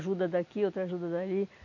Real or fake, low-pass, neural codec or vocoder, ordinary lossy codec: real; 7.2 kHz; none; none